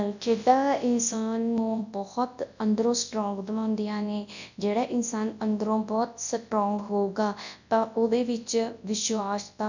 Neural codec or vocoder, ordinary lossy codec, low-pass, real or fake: codec, 24 kHz, 0.9 kbps, WavTokenizer, large speech release; none; 7.2 kHz; fake